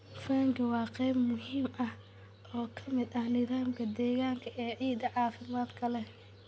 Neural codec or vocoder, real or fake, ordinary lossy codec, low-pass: none; real; none; none